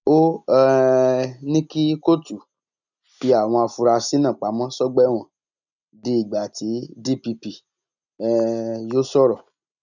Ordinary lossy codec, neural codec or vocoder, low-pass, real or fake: none; none; 7.2 kHz; real